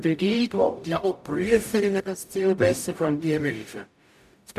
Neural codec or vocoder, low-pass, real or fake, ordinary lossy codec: codec, 44.1 kHz, 0.9 kbps, DAC; 14.4 kHz; fake; none